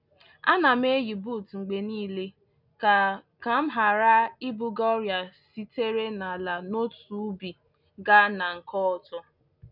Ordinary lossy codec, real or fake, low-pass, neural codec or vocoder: none; real; 5.4 kHz; none